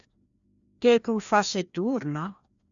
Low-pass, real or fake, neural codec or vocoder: 7.2 kHz; fake; codec, 16 kHz, 1 kbps, FreqCodec, larger model